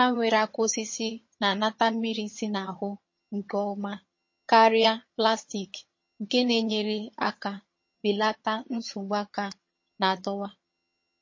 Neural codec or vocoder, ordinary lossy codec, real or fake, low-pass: vocoder, 22.05 kHz, 80 mel bands, HiFi-GAN; MP3, 32 kbps; fake; 7.2 kHz